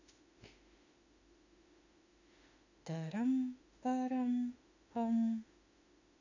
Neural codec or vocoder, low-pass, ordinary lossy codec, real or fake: autoencoder, 48 kHz, 32 numbers a frame, DAC-VAE, trained on Japanese speech; 7.2 kHz; none; fake